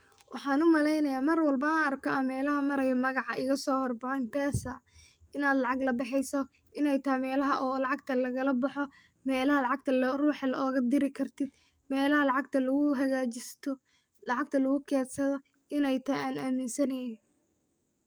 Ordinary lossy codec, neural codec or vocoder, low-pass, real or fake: none; codec, 44.1 kHz, 7.8 kbps, DAC; none; fake